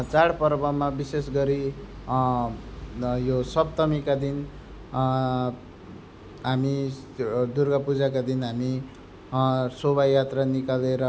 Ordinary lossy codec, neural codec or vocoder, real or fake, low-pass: none; none; real; none